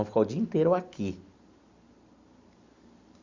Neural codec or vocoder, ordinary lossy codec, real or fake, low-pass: none; Opus, 64 kbps; real; 7.2 kHz